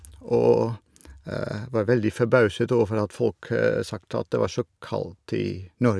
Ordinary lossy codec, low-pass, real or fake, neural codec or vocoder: none; none; real; none